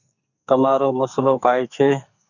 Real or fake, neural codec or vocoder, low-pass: fake; codec, 44.1 kHz, 2.6 kbps, SNAC; 7.2 kHz